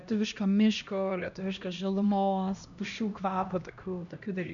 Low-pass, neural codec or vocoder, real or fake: 7.2 kHz; codec, 16 kHz, 1 kbps, X-Codec, HuBERT features, trained on LibriSpeech; fake